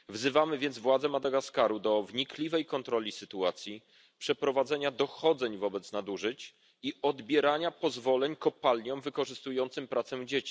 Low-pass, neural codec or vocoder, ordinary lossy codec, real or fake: none; none; none; real